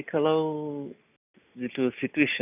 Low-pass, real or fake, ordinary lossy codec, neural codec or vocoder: 3.6 kHz; real; none; none